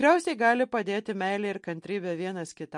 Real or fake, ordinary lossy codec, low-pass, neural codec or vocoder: real; MP3, 48 kbps; 10.8 kHz; none